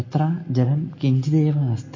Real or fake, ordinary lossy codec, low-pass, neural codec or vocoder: real; MP3, 32 kbps; 7.2 kHz; none